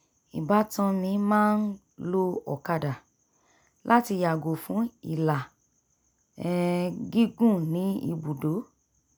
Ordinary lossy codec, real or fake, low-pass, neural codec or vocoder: none; real; none; none